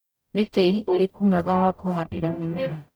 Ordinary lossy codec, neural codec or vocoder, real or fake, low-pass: none; codec, 44.1 kHz, 0.9 kbps, DAC; fake; none